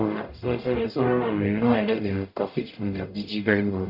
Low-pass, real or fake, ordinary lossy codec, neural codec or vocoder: 5.4 kHz; fake; AAC, 48 kbps; codec, 44.1 kHz, 0.9 kbps, DAC